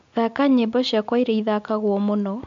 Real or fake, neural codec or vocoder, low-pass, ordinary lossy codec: real; none; 7.2 kHz; MP3, 64 kbps